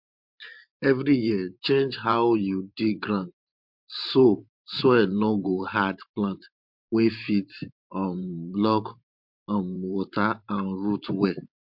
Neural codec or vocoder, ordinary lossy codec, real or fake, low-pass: vocoder, 44.1 kHz, 128 mel bands every 512 samples, BigVGAN v2; MP3, 48 kbps; fake; 5.4 kHz